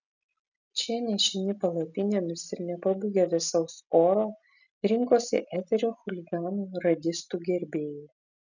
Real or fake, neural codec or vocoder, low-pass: real; none; 7.2 kHz